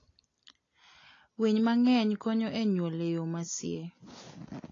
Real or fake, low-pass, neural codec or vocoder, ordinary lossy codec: real; 7.2 kHz; none; AAC, 32 kbps